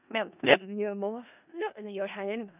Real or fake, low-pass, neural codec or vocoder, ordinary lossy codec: fake; 3.6 kHz; codec, 16 kHz in and 24 kHz out, 0.4 kbps, LongCat-Audio-Codec, four codebook decoder; none